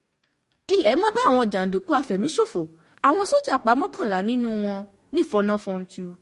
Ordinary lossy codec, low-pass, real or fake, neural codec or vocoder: MP3, 48 kbps; 14.4 kHz; fake; codec, 44.1 kHz, 2.6 kbps, DAC